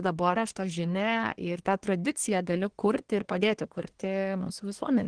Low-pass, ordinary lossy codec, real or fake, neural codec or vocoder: 9.9 kHz; Opus, 16 kbps; fake; codec, 24 kHz, 1 kbps, SNAC